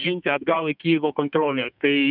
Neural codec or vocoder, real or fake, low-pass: codec, 32 kHz, 1.9 kbps, SNAC; fake; 5.4 kHz